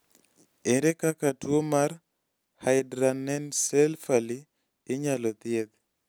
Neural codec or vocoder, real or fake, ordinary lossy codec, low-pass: none; real; none; none